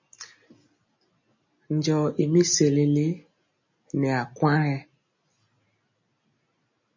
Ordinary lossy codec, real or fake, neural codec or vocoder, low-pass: MP3, 32 kbps; real; none; 7.2 kHz